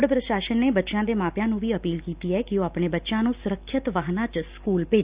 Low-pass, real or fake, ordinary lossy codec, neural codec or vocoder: 3.6 kHz; real; Opus, 64 kbps; none